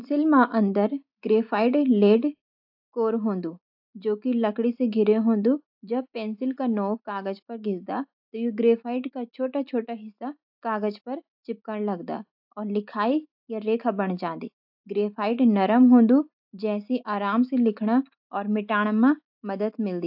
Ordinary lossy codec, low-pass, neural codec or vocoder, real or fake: AAC, 48 kbps; 5.4 kHz; none; real